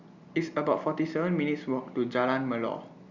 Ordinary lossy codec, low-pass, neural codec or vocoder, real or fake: Opus, 64 kbps; 7.2 kHz; none; real